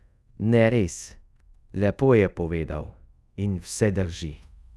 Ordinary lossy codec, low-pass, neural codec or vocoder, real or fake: none; none; codec, 24 kHz, 0.5 kbps, DualCodec; fake